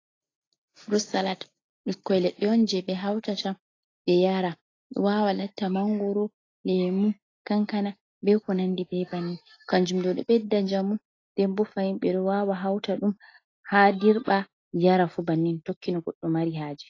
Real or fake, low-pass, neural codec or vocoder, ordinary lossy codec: real; 7.2 kHz; none; AAC, 32 kbps